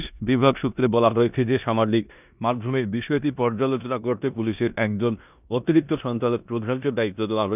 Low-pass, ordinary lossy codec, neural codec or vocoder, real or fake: 3.6 kHz; none; codec, 16 kHz in and 24 kHz out, 0.9 kbps, LongCat-Audio-Codec, four codebook decoder; fake